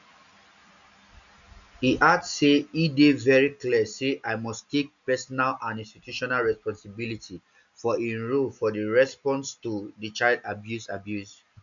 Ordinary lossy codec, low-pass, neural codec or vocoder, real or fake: none; 7.2 kHz; none; real